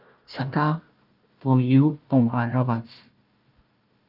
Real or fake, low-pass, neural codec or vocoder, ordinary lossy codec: fake; 5.4 kHz; codec, 16 kHz, 1 kbps, FunCodec, trained on Chinese and English, 50 frames a second; Opus, 32 kbps